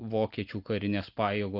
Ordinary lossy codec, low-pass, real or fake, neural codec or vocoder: Opus, 24 kbps; 5.4 kHz; real; none